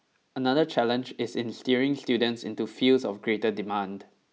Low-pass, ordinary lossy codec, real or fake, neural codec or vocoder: none; none; real; none